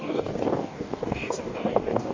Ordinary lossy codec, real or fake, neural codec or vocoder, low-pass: MP3, 48 kbps; fake; codec, 44.1 kHz, 2.6 kbps, DAC; 7.2 kHz